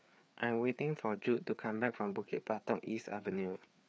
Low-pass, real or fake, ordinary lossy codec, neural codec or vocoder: none; fake; none; codec, 16 kHz, 4 kbps, FreqCodec, larger model